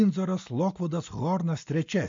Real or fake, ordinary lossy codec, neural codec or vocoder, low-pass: real; MP3, 48 kbps; none; 7.2 kHz